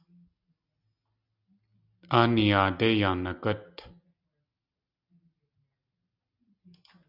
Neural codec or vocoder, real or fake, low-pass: none; real; 5.4 kHz